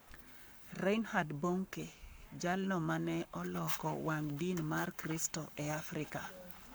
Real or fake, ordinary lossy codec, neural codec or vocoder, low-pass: fake; none; codec, 44.1 kHz, 7.8 kbps, Pupu-Codec; none